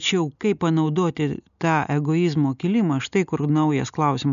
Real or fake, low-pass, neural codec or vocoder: real; 7.2 kHz; none